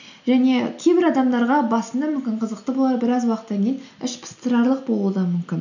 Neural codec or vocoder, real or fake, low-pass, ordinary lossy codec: none; real; 7.2 kHz; none